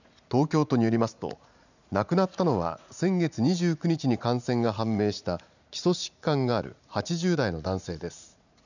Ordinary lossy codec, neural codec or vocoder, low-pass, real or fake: none; vocoder, 44.1 kHz, 80 mel bands, Vocos; 7.2 kHz; fake